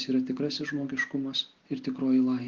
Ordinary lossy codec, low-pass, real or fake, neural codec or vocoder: Opus, 16 kbps; 7.2 kHz; real; none